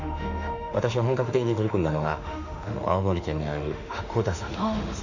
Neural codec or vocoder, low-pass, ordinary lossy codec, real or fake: autoencoder, 48 kHz, 32 numbers a frame, DAC-VAE, trained on Japanese speech; 7.2 kHz; none; fake